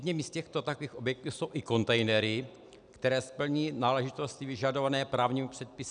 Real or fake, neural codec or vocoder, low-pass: real; none; 9.9 kHz